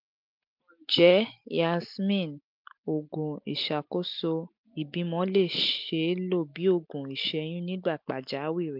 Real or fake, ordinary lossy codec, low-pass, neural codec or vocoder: real; MP3, 48 kbps; 5.4 kHz; none